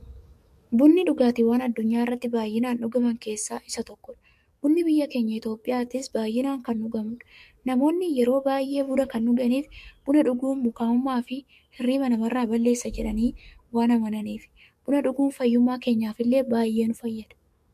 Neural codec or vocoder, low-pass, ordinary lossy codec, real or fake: codec, 44.1 kHz, 7.8 kbps, DAC; 14.4 kHz; MP3, 64 kbps; fake